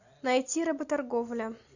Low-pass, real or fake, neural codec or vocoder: 7.2 kHz; real; none